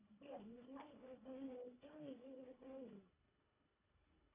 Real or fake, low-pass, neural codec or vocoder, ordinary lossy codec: fake; 3.6 kHz; codec, 24 kHz, 1.5 kbps, HILCodec; MP3, 32 kbps